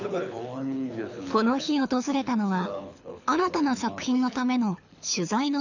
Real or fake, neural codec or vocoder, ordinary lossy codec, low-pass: fake; codec, 24 kHz, 6 kbps, HILCodec; none; 7.2 kHz